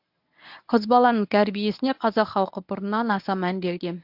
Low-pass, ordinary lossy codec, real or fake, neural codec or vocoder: 5.4 kHz; AAC, 48 kbps; fake; codec, 24 kHz, 0.9 kbps, WavTokenizer, medium speech release version 1